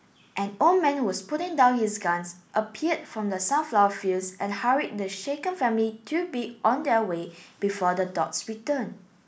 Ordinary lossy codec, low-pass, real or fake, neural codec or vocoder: none; none; real; none